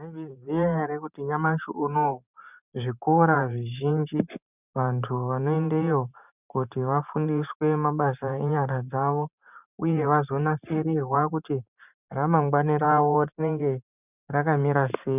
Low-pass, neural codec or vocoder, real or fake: 3.6 kHz; vocoder, 44.1 kHz, 128 mel bands every 512 samples, BigVGAN v2; fake